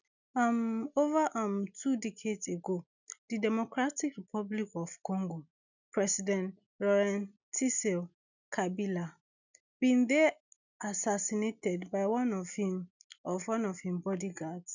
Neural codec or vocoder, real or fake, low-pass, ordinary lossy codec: none; real; 7.2 kHz; none